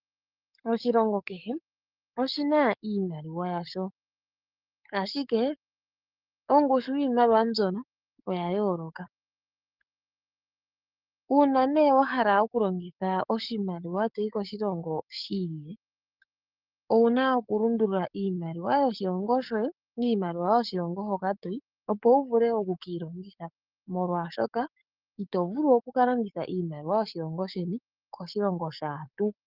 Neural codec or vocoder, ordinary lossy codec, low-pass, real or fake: codec, 44.1 kHz, 7.8 kbps, DAC; Opus, 24 kbps; 5.4 kHz; fake